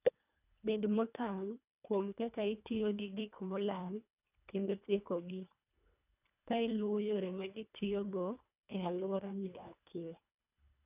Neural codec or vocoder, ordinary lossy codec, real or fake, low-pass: codec, 24 kHz, 1.5 kbps, HILCodec; none; fake; 3.6 kHz